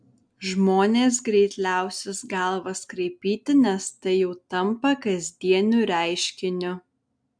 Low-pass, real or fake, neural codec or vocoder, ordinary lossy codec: 9.9 kHz; real; none; MP3, 64 kbps